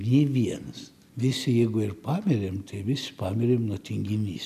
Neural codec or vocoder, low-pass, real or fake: none; 14.4 kHz; real